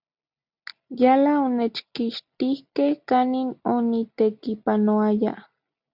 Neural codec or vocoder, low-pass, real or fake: none; 5.4 kHz; real